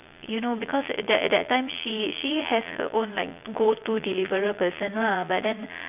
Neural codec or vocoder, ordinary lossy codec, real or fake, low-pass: vocoder, 22.05 kHz, 80 mel bands, Vocos; none; fake; 3.6 kHz